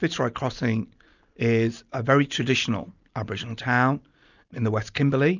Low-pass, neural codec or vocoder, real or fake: 7.2 kHz; none; real